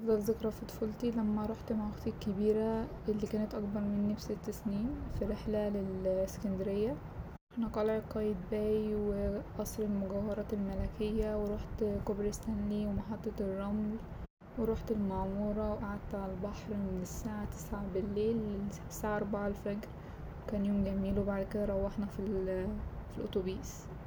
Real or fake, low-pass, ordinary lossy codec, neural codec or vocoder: real; none; none; none